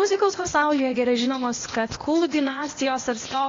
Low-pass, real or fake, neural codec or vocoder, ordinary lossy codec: 7.2 kHz; fake; codec, 16 kHz, 0.8 kbps, ZipCodec; AAC, 32 kbps